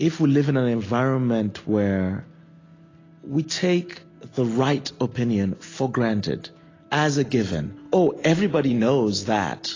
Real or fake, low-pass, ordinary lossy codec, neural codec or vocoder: real; 7.2 kHz; AAC, 32 kbps; none